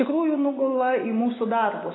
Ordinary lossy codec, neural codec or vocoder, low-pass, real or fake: AAC, 16 kbps; none; 7.2 kHz; real